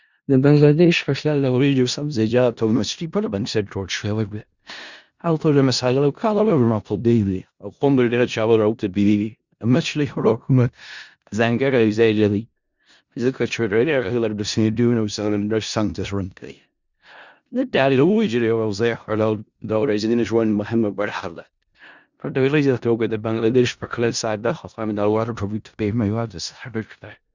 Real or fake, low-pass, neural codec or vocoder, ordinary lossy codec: fake; 7.2 kHz; codec, 16 kHz in and 24 kHz out, 0.4 kbps, LongCat-Audio-Codec, four codebook decoder; Opus, 64 kbps